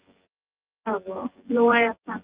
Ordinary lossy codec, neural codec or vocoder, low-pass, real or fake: Opus, 32 kbps; vocoder, 24 kHz, 100 mel bands, Vocos; 3.6 kHz; fake